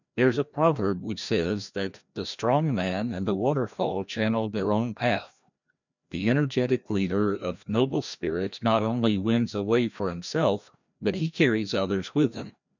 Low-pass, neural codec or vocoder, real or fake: 7.2 kHz; codec, 16 kHz, 1 kbps, FreqCodec, larger model; fake